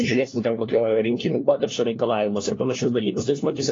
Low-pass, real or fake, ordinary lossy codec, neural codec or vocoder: 7.2 kHz; fake; AAC, 32 kbps; codec, 16 kHz, 1 kbps, FunCodec, trained on LibriTTS, 50 frames a second